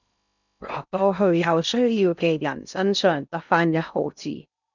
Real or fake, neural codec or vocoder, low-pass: fake; codec, 16 kHz in and 24 kHz out, 0.6 kbps, FocalCodec, streaming, 2048 codes; 7.2 kHz